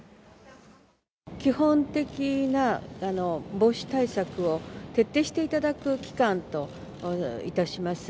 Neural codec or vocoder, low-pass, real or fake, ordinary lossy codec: none; none; real; none